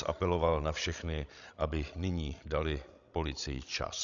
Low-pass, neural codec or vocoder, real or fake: 7.2 kHz; codec, 16 kHz, 16 kbps, FreqCodec, larger model; fake